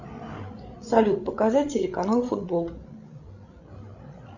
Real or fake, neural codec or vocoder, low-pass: fake; codec, 16 kHz, 16 kbps, FreqCodec, larger model; 7.2 kHz